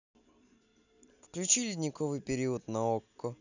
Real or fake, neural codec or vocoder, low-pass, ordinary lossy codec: real; none; 7.2 kHz; none